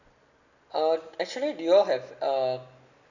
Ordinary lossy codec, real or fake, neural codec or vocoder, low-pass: none; real; none; 7.2 kHz